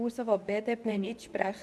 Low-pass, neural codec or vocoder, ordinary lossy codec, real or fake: none; codec, 24 kHz, 0.9 kbps, WavTokenizer, medium speech release version 2; none; fake